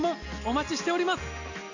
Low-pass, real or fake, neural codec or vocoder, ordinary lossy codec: 7.2 kHz; real; none; none